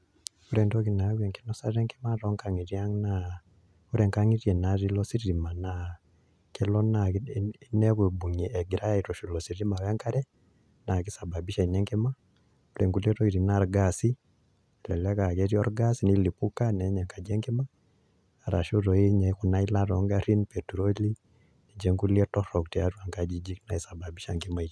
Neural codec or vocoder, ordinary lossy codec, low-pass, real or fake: none; none; none; real